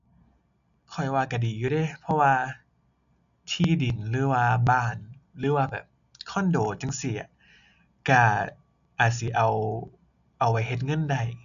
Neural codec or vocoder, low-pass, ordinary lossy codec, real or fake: none; 7.2 kHz; none; real